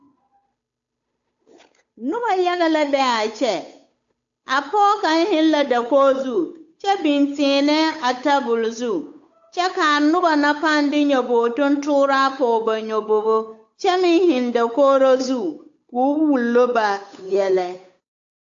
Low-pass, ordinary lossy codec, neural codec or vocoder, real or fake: 7.2 kHz; AAC, 64 kbps; codec, 16 kHz, 8 kbps, FunCodec, trained on Chinese and English, 25 frames a second; fake